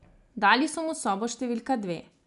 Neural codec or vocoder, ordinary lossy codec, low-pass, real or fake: none; none; 10.8 kHz; real